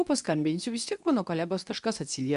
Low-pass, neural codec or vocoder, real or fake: 10.8 kHz; codec, 24 kHz, 0.9 kbps, WavTokenizer, medium speech release version 2; fake